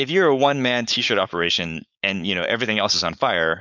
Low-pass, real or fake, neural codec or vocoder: 7.2 kHz; fake; codec, 16 kHz, 4.8 kbps, FACodec